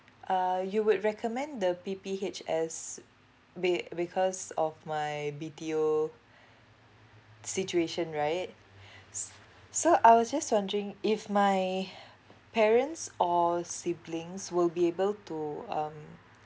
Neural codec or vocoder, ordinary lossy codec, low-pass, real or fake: none; none; none; real